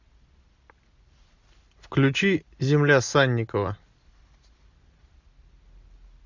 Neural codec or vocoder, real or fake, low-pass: none; real; 7.2 kHz